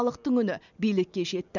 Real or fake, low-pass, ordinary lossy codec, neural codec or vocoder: real; 7.2 kHz; none; none